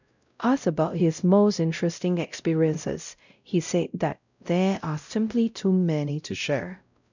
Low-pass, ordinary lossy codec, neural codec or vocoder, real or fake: 7.2 kHz; none; codec, 16 kHz, 0.5 kbps, X-Codec, WavLM features, trained on Multilingual LibriSpeech; fake